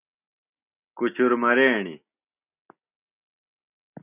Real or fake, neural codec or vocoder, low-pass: real; none; 3.6 kHz